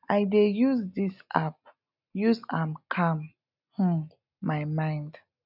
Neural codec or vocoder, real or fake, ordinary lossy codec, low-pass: none; real; none; 5.4 kHz